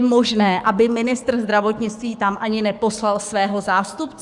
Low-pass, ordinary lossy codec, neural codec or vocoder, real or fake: 10.8 kHz; Opus, 64 kbps; codec, 44.1 kHz, 7.8 kbps, DAC; fake